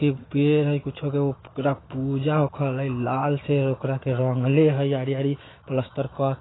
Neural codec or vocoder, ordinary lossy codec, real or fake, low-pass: autoencoder, 48 kHz, 128 numbers a frame, DAC-VAE, trained on Japanese speech; AAC, 16 kbps; fake; 7.2 kHz